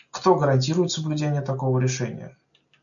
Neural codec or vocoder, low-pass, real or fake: none; 7.2 kHz; real